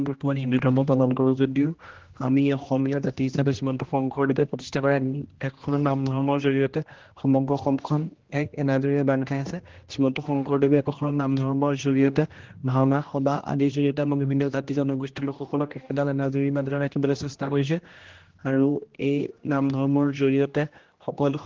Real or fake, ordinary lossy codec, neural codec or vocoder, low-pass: fake; Opus, 16 kbps; codec, 16 kHz, 1 kbps, X-Codec, HuBERT features, trained on general audio; 7.2 kHz